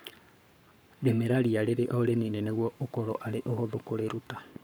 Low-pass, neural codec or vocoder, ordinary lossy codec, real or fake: none; codec, 44.1 kHz, 7.8 kbps, Pupu-Codec; none; fake